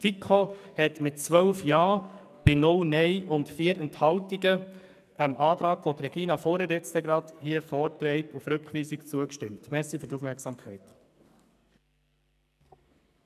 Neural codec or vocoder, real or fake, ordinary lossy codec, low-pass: codec, 44.1 kHz, 2.6 kbps, SNAC; fake; none; 14.4 kHz